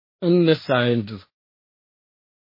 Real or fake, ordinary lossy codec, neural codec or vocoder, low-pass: fake; MP3, 24 kbps; codec, 16 kHz, 1.1 kbps, Voila-Tokenizer; 5.4 kHz